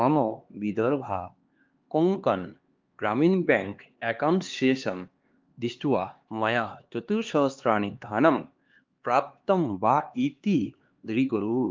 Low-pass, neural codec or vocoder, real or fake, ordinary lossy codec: 7.2 kHz; codec, 16 kHz, 2 kbps, X-Codec, HuBERT features, trained on LibriSpeech; fake; Opus, 32 kbps